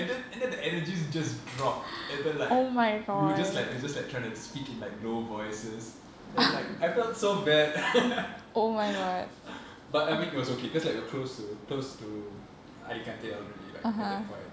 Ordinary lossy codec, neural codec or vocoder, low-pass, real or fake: none; none; none; real